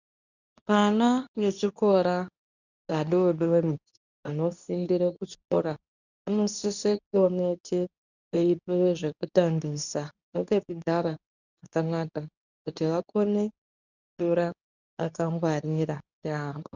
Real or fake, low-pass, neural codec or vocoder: fake; 7.2 kHz; codec, 24 kHz, 0.9 kbps, WavTokenizer, medium speech release version 2